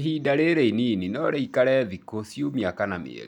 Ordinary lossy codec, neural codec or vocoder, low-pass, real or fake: none; none; 19.8 kHz; real